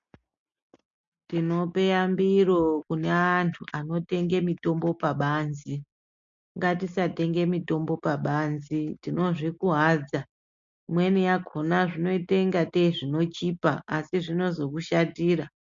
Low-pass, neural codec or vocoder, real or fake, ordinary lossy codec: 7.2 kHz; none; real; MP3, 48 kbps